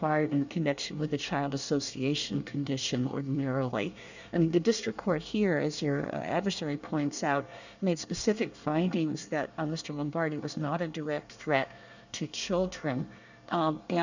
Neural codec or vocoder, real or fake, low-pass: codec, 24 kHz, 1 kbps, SNAC; fake; 7.2 kHz